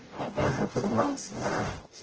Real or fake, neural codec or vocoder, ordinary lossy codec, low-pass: fake; codec, 44.1 kHz, 0.9 kbps, DAC; Opus, 16 kbps; 7.2 kHz